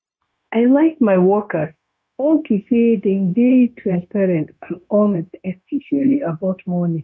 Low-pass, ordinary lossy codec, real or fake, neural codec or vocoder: none; none; fake; codec, 16 kHz, 0.9 kbps, LongCat-Audio-Codec